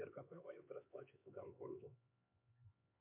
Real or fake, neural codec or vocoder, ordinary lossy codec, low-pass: fake; codec, 16 kHz, 4 kbps, X-Codec, HuBERT features, trained on LibriSpeech; MP3, 32 kbps; 3.6 kHz